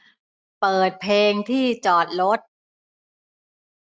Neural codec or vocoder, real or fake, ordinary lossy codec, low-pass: none; real; none; none